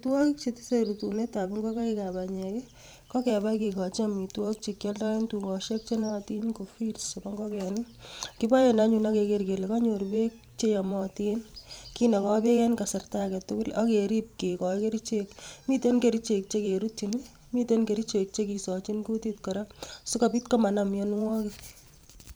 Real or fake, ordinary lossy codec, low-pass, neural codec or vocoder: fake; none; none; vocoder, 44.1 kHz, 128 mel bands every 512 samples, BigVGAN v2